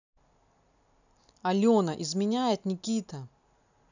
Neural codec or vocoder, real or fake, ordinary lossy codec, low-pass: none; real; none; 7.2 kHz